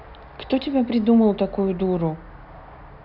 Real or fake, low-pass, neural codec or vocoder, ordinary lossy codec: real; 5.4 kHz; none; none